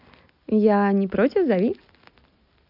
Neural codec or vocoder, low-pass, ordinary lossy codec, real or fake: none; 5.4 kHz; none; real